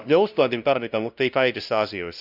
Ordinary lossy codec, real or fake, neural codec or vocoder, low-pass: none; fake; codec, 16 kHz, 0.5 kbps, FunCodec, trained on LibriTTS, 25 frames a second; 5.4 kHz